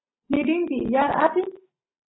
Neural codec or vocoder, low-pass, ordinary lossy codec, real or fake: none; 7.2 kHz; AAC, 16 kbps; real